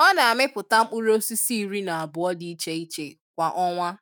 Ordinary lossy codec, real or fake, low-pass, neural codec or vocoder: none; fake; none; autoencoder, 48 kHz, 128 numbers a frame, DAC-VAE, trained on Japanese speech